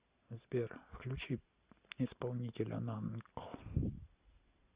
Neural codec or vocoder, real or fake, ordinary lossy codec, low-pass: none; real; Opus, 64 kbps; 3.6 kHz